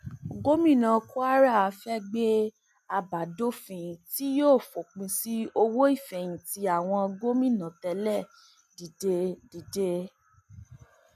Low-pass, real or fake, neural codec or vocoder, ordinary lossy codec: 14.4 kHz; real; none; none